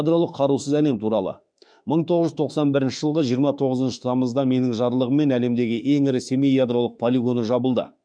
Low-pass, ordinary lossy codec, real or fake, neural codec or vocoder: 9.9 kHz; none; fake; autoencoder, 48 kHz, 32 numbers a frame, DAC-VAE, trained on Japanese speech